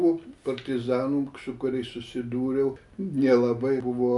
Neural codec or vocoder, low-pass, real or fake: none; 10.8 kHz; real